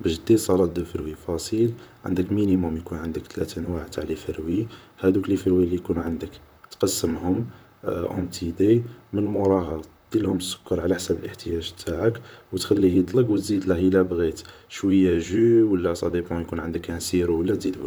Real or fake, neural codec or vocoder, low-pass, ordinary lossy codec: fake; vocoder, 44.1 kHz, 128 mel bands, Pupu-Vocoder; none; none